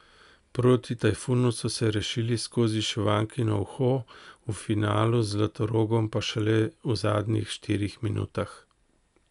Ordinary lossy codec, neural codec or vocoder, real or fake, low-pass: none; none; real; 10.8 kHz